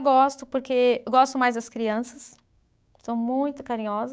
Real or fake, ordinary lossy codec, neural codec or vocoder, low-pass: fake; none; codec, 16 kHz, 6 kbps, DAC; none